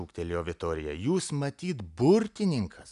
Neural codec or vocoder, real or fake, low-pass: none; real; 10.8 kHz